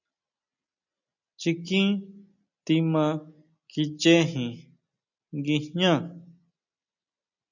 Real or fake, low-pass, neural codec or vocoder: real; 7.2 kHz; none